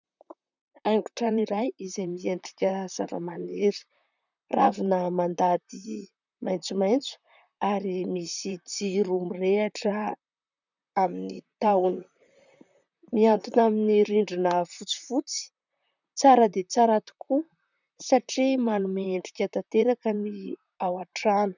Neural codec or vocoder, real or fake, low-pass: vocoder, 44.1 kHz, 128 mel bands, Pupu-Vocoder; fake; 7.2 kHz